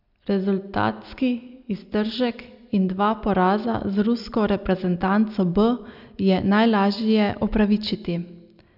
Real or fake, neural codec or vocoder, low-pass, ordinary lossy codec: real; none; 5.4 kHz; none